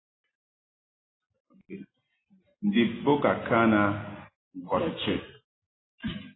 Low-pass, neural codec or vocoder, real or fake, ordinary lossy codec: 7.2 kHz; none; real; AAC, 16 kbps